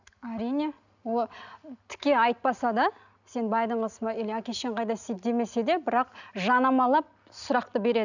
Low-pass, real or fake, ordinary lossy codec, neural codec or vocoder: 7.2 kHz; real; none; none